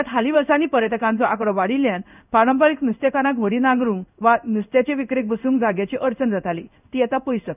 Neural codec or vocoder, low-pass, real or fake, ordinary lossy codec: codec, 16 kHz in and 24 kHz out, 1 kbps, XY-Tokenizer; 3.6 kHz; fake; none